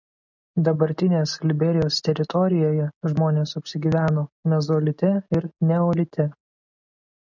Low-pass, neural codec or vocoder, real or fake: 7.2 kHz; none; real